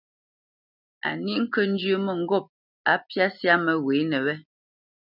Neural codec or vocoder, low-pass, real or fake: none; 5.4 kHz; real